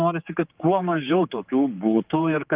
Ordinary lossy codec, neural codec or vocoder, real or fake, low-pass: Opus, 32 kbps; codec, 16 kHz, 4 kbps, X-Codec, HuBERT features, trained on general audio; fake; 3.6 kHz